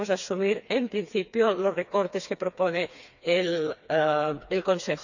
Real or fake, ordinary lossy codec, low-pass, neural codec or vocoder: fake; none; 7.2 kHz; codec, 16 kHz, 4 kbps, FreqCodec, smaller model